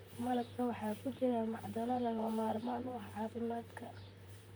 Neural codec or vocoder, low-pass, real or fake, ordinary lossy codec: vocoder, 44.1 kHz, 128 mel bands, Pupu-Vocoder; none; fake; none